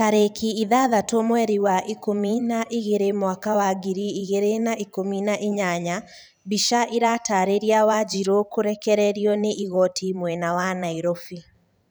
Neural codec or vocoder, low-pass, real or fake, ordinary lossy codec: vocoder, 44.1 kHz, 128 mel bands every 512 samples, BigVGAN v2; none; fake; none